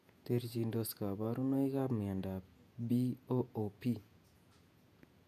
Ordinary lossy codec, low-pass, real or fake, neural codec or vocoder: none; 14.4 kHz; fake; vocoder, 48 kHz, 128 mel bands, Vocos